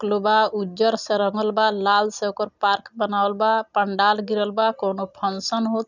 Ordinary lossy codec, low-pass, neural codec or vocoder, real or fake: none; 7.2 kHz; none; real